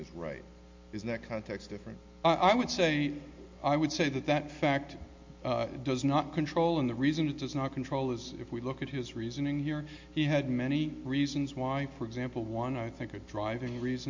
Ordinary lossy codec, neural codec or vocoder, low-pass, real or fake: MP3, 48 kbps; none; 7.2 kHz; real